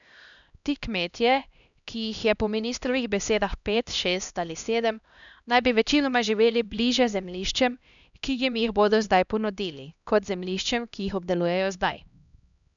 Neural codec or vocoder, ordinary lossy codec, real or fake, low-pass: codec, 16 kHz, 1 kbps, X-Codec, HuBERT features, trained on LibriSpeech; none; fake; 7.2 kHz